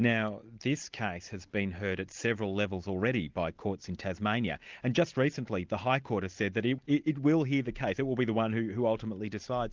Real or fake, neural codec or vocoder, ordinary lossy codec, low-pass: real; none; Opus, 24 kbps; 7.2 kHz